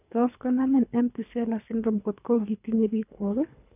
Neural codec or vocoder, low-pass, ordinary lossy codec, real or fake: codec, 24 kHz, 3 kbps, HILCodec; 3.6 kHz; none; fake